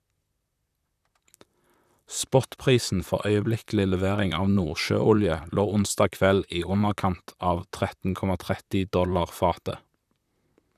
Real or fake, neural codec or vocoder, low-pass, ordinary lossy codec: fake; vocoder, 44.1 kHz, 128 mel bands, Pupu-Vocoder; 14.4 kHz; none